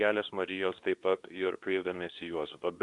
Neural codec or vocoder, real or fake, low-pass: codec, 24 kHz, 0.9 kbps, WavTokenizer, medium speech release version 2; fake; 10.8 kHz